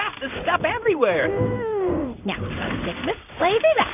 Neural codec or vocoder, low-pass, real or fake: codec, 16 kHz in and 24 kHz out, 1 kbps, XY-Tokenizer; 3.6 kHz; fake